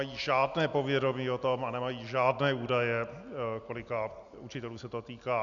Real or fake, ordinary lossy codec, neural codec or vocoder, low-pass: real; MP3, 96 kbps; none; 7.2 kHz